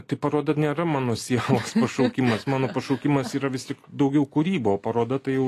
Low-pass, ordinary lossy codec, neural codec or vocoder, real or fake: 14.4 kHz; AAC, 48 kbps; none; real